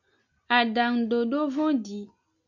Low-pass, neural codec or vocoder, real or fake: 7.2 kHz; none; real